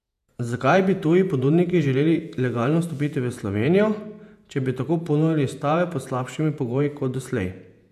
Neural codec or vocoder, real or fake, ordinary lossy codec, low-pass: vocoder, 48 kHz, 128 mel bands, Vocos; fake; none; 14.4 kHz